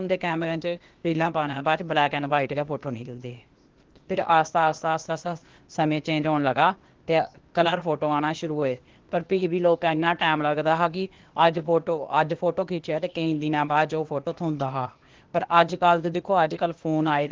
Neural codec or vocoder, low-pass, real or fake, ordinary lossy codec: codec, 16 kHz, 0.8 kbps, ZipCodec; 7.2 kHz; fake; Opus, 16 kbps